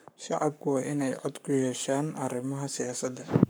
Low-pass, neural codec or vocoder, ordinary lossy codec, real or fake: none; codec, 44.1 kHz, 7.8 kbps, Pupu-Codec; none; fake